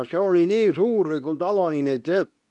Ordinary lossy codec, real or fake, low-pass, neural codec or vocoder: none; fake; 10.8 kHz; codec, 24 kHz, 0.9 kbps, WavTokenizer, medium speech release version 2